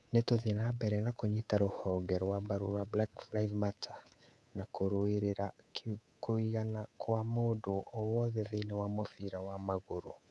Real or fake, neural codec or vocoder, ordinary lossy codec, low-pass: fake; codec, 24 kHz, 3.1 kbps, DualCodec; none; none